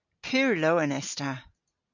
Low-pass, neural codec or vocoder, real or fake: 7.2 kHz; none; real